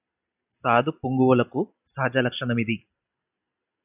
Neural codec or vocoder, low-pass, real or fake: none; 3.6 kHz; real